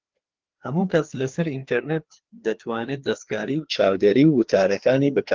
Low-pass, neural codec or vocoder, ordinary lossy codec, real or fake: 7.2 kHz; codec, 16 kHz, 2 kbps, FreqCodec, larger model; Opus, 16 kbps; fake